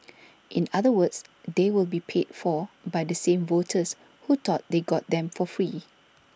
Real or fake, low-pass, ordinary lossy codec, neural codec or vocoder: real; none; none; none